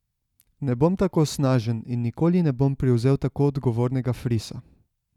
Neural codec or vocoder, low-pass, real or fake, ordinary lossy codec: none; 19.8 kHz; real; none